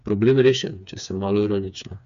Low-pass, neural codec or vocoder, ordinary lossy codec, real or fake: 7.2 kHz; codec, 16 kHz, 4 kbps, FreqCodec, smaller model; none; fake